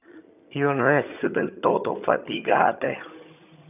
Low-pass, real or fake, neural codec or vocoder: 3.6 kHz; fake; vocoder, 22.05 kHz, 80 mel bands, HiFi-GAN